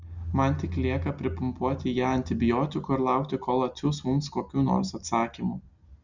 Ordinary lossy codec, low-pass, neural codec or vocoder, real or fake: Opus, 64 kbps; 7.2 kHz; none; real